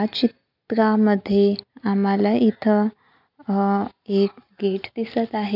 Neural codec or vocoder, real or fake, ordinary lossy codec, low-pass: none; real; none; 5.4 kHz